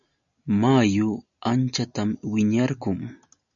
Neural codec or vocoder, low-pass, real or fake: none; 7.2 kHz; real